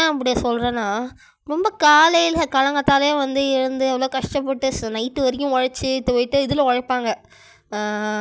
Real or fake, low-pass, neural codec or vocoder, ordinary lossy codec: real; none; none; none